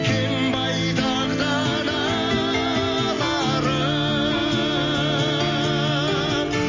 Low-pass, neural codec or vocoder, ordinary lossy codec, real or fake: 7.2 kHz; none; MP3, 32 kbps; real